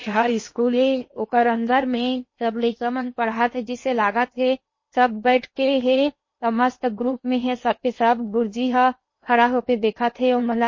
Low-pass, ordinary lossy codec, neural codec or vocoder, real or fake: 7.2 kHz; MP3, 32 kbps; codec, 16 kHz in and 24 kHz out, 0.8 kbps, FocalCodec, streaming, 65536 codes; fake